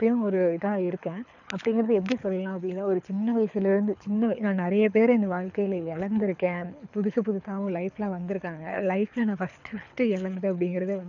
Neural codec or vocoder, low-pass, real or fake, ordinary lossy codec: codec, 24 kHz, 6 kbps, HILCodec; 7.2 kHz; fake; none